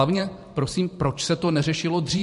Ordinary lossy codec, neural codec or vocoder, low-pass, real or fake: MP3, 48 kbps; vocoder, 44.1 kHz, 128 mel bands every 512 samples, BigVGAN v2; 14.4 kHz; fake